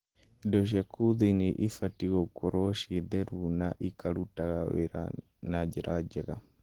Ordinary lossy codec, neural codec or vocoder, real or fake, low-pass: Opus, 16 kbps; none; real; 19.8 kHz